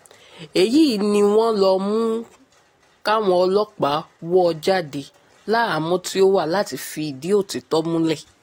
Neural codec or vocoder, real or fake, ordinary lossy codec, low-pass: none; real; AAC, 48 kbps; 19.8 kHz